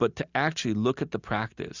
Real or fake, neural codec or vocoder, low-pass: real; none; 7.2 kHz